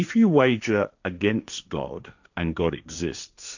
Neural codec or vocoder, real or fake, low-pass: codec, 16 kHz, 1.1 kbps, Voila-Tokenizer; fake; 7.2 kHz